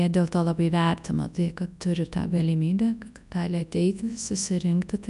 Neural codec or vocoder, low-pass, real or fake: codec, 24 kHz, 0.9 kbps, WavTokenizer, large speech release; 10.8 kHz; fake